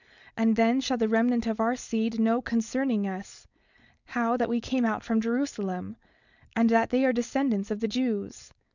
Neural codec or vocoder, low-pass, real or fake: codec, 16 kHz, 4.8 kbps, FACodec; 7.2 kHz; fake